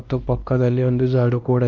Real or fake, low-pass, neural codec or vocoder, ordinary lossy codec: fake; 7.2 kHz; codec, 16 kHz, 1 kbps, X-Codec, WavLM features, trained on Multilingual LibriSpeech; Opus, 24 kbps